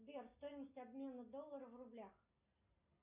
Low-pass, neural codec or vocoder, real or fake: 3.6 kHz; none; real